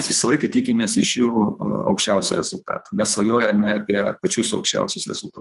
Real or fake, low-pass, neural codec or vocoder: fake; 10.8 kHz; codec, 24 kHz, 3 kbps, HILCodec